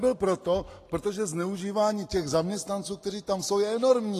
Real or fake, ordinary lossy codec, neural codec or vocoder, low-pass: real; AAC, 48 kbps; none; 14.4 kHz